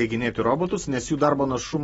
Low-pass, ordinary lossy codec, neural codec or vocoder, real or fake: 19.8 kHz; AAC, 24 kbps; none; real